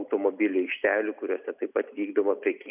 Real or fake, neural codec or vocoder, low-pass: real; none; 3.6 kHz